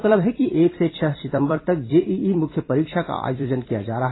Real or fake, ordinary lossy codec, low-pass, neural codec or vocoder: fake; AAC, 16 kbps; 7.2 kHz; vocoder, 44.1 kHz, 128 mel bands every 256 samples, BigVGAN v2